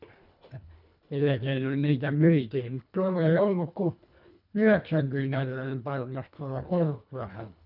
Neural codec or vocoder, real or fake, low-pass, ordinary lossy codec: codec, 24 kHz, 1.5 kbps, HILCodec; fake; 5.4 kHz; none